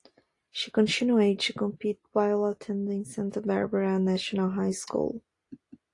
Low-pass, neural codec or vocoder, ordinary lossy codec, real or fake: 10.8 kHz; none; AAC, 48 kbps; real